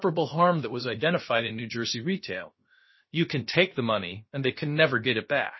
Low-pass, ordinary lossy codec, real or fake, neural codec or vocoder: 7.2 kHz; MP3, 24 kbps; fake; codec, 16 kHz, about 1 kbps, DyCAST, with the encoder's durations